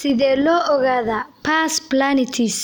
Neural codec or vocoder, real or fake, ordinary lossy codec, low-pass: none; real; none; none